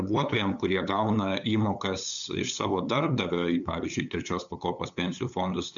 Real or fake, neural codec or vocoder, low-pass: fake; codec, 16 kHz, 8 kbps, FunCodec, trained on LibriTTS, 25 frames a second; 7.2 kHz